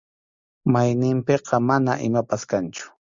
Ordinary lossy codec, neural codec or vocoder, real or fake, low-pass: Opus, 64 kbps; none; real; 7.2 kHz